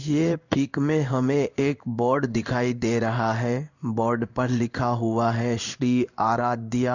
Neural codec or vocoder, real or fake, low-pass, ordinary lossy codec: codec, 16 kHz in and 24 kHz out, 1 kbps, XY-Tokenizer; fake; 7.2 kHz; none